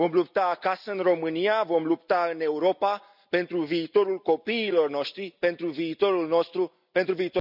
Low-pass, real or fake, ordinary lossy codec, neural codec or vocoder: 5.4 kHz; real; none; none